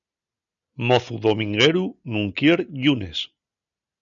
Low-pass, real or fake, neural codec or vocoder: 7.2 kHz; real; none